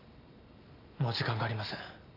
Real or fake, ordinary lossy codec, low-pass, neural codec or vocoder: real; AAC, 32 kbps; 5.4 kHz; none